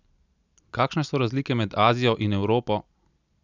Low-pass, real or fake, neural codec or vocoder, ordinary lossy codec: 7.2 kHz; real; none; none